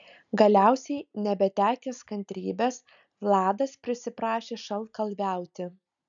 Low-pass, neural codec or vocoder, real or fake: 7.2 kHz; none; real